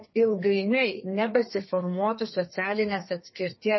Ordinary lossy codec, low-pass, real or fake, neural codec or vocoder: MP3, 24 kbps; 7.2 kHz; fake; codec, 32 kHz, 1.9 kbps, SNAC